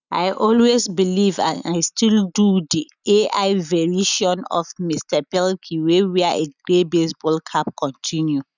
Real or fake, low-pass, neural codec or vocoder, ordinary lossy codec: real; 7.2 kHz; none; none